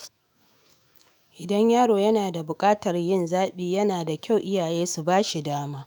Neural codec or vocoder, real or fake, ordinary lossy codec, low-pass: autoencoder, 48 kHz, 128 numbers a frame, DAC-VAE, trained on Japanese speech; fake; none; none